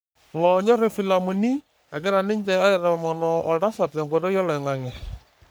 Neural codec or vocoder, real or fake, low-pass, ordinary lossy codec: codec, 44.1 kHz, 3.4 kbps, Pupu-Codec; fake; none; none